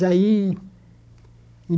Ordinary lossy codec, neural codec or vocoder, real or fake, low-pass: none; codec, 16 kHz, 16 kbps, FunCodec, trained on Chinese and English, 50 frames a second; fake; none